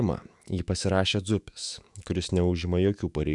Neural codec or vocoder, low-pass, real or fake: autoencoder, 48 kHz, 128 numbers a frame, DAC-VAE, trained on Japanese speech; 10.8 kHz; fake